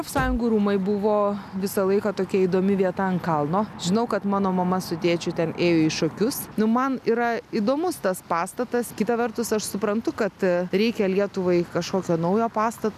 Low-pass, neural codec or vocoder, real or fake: 14.4 kHz; none; real